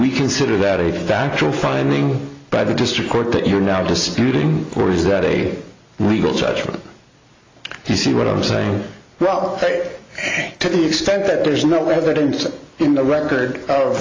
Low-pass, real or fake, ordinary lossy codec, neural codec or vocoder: 7.2 kHz; real; AAC, 32 kbps; none